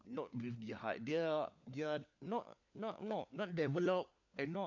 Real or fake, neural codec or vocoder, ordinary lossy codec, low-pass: fake; codec, 16 kHz, 4 kbps, FunCodec, trained on LibriTTS, 50 frames a second; AAC, 48 kbps; 7.2 kHz